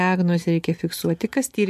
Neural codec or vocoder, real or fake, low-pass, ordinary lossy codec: none; real; 14.4 kHz; MP3, 64 kbps